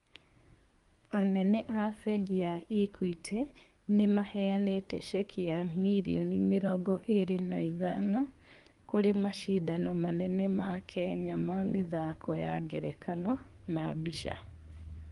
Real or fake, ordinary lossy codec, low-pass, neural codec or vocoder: fake; Opus, 32 kbps; 10.8 kHz; codec, 24 kHz, 1 kbps, SNAC